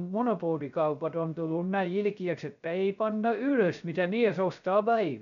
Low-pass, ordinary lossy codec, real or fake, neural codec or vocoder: 7.2 kHz; none; fake; codec, 16 kHz, 0.3 kbps, FocalCodec